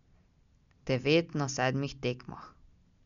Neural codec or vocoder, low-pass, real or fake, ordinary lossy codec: none; 7.2 kHz; real; none